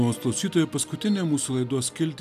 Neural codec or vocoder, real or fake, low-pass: none; real; 14.4 kHz